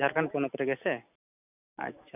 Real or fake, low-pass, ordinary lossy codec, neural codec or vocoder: real; 3.6 kHz; none; none